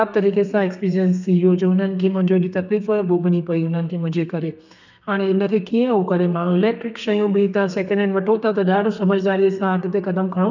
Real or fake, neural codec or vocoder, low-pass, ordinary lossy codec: fake; codec, 32 kHz, 1.9 kbps, SNAC; 7.2 kHz; none